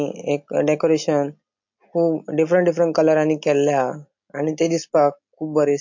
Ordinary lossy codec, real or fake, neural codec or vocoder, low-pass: MP3, 48 kbps; real; none; 7.2 kHz